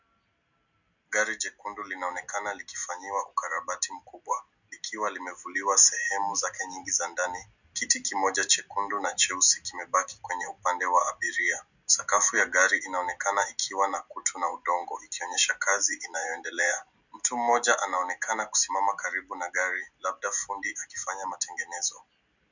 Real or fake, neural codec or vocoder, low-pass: real; none; 7.2 kHz